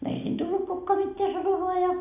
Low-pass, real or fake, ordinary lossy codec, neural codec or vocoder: 3.6 kHz; real; none; none